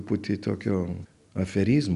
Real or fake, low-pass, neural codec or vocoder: real; 10.8 kHz; none